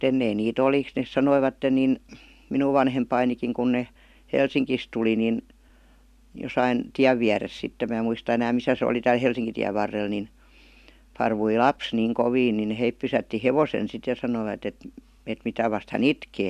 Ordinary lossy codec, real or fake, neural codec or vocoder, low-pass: none; real; none; 14.4 kHz